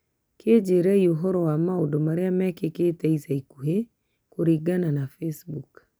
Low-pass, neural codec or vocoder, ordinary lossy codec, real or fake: none; none; none; real